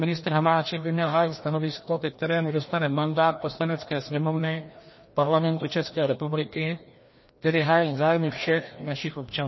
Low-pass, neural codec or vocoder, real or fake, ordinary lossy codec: 7.2 kHz; codec, 16 kHz, 1 kbps, FreqCodec, larger model; fake; MP3, 24 kbps